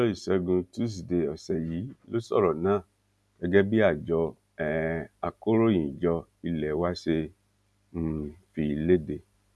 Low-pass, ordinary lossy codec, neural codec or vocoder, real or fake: none; none; none; real